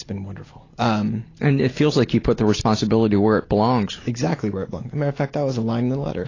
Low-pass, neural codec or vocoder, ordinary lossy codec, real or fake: 7.2 kHz; none; AAC, 32 kbps; real